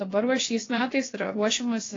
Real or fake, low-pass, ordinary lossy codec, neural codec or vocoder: fake; 7.2 kHz; AAC, 32 kbps; codec, 16 kHz, about 1 kbps, DyCAST, with the encoder's durations